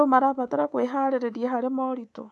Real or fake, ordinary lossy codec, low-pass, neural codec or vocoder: fake; none; none; codec, 24 kHz, 3.1 kbps, DualCodec